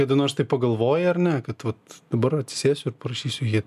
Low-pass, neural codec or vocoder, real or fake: 14.4 kHz; none; real